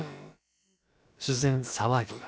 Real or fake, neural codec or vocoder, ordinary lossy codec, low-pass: fake; codec, 16 kHz, about 1 kbps, DyCAST, with the encoder's durations; none; none